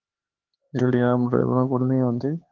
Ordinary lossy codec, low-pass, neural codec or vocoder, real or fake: Opus, 16 kbps; 7.2 kHz; codec, 16 kHz, 4 kbps, X-Codec, HuBERT features, trained on LibriSpeech; fake